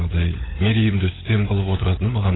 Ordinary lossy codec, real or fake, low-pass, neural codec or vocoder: AAC, 16 kbps; fake; 7.2 kHz; codec, 16 kHz, 16 kbps, FunCodec, trained on LibriTTS, 50 frames a second